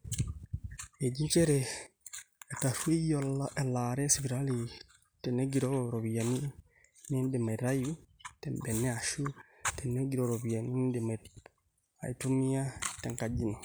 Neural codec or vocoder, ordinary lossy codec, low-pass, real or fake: none; none; none; real